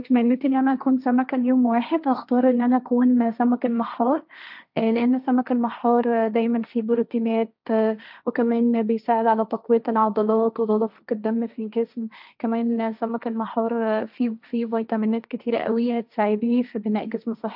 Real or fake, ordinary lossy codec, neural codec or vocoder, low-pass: fake; none; codec, 16 kHz, 1.1 kbps, Voila-Tokenizer; 5.4 kHz